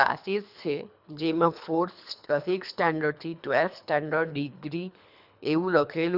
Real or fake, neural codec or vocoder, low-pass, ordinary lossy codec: fake; codec, 24 kHz, 3 kbps, HILCodec; 5.4 kHz; none